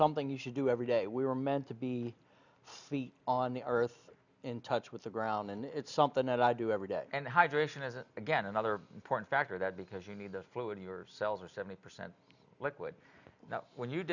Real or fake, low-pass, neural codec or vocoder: real; 7.2 kHz; none